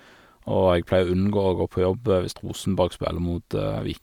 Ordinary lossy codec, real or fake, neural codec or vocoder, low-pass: none; real; none; 19.8 kHz